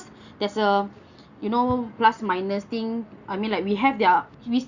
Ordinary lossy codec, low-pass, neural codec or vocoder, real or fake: Opus, 64 kbps; 7.2 kHz; none; real